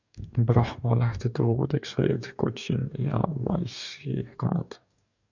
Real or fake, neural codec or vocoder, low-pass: fake; codec, 44.1 kHz, 2.6 kbps, DAC; 7.2 kHz